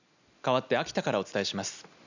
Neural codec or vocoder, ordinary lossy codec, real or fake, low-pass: none; none; real; 7.2 kHz